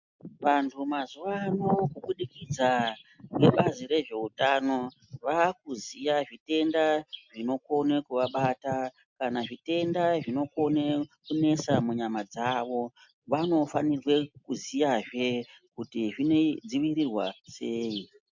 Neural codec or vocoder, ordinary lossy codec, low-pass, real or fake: none; MP3, 64 kbps; 7.2 kHz; real